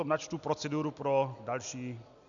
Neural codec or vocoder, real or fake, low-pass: none; real; 7.2 kHz